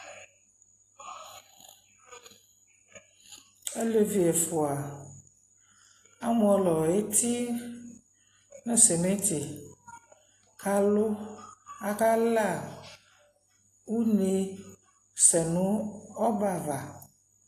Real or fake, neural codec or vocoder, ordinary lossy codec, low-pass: real; none; AAC, 48 kbps; 14.4 kHz